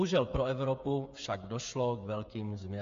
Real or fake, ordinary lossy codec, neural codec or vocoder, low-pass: fake; MP3, 48 kbps; codec, 16 kHz, 8 kbps, FreqCodec, smaller model; 7.2 kHz